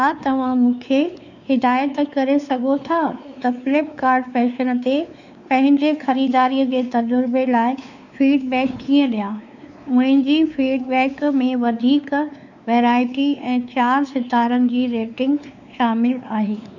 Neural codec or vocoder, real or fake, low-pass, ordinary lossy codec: codec, 16 kHz, 4 kbps, X-Codec, WavLM features, trained on Multilingual LibriSpeech; fake; 7.2 kHz; none